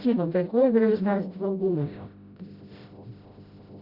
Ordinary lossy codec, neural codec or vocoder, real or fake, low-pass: Opus, 64 kbps; codec, 16 kHz, 0.5 kbps, FreqCodec, smaller model; fake; 5.4 kHz